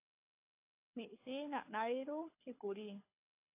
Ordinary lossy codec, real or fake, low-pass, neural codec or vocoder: AAC, 24 kbps; fake; 3.6 kHz; codec, 24 kHz, 6 kbps, HILCodec